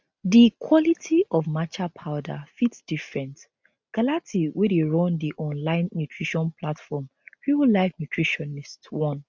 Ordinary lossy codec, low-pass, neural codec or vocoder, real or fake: none; none; none; real